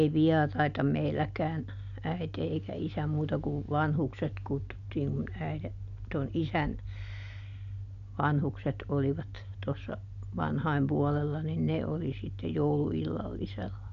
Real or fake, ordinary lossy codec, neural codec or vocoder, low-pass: real; none; none; 7.2 kHz